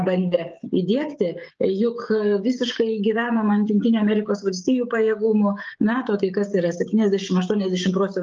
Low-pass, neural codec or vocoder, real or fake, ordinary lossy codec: 7.2 kHz; codec, 16 kHz, 16 kbps, FreqCodec, smaller model; fake; Opus, 32 kbps